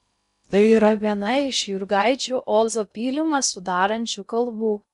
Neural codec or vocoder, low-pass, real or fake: codec, 16 kHz in and 24 kHz out, 0.6 kbps, FocalCodec, streaming, 2048 codes; 10.8 kHz; fake